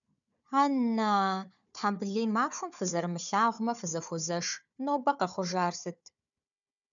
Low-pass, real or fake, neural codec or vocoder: 7.2 kHz; fake; codec, 16 kHz, 4 kbps, FunCodec, trained on Chinese and English, 50 frames a second